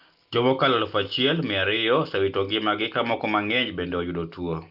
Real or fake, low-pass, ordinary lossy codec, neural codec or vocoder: real; 5.4 kHz; Opus, 32 kbps; none